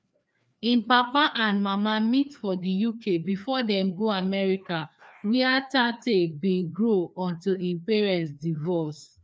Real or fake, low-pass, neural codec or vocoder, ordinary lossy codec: fake; none; codec, 16 kHz, 2 kbps, FreqCodec, larger model; none